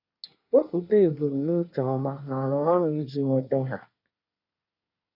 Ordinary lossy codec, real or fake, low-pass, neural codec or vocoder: none; fake; 5.4 kHz; codec, 24 kHz, 1 kbps, SNAC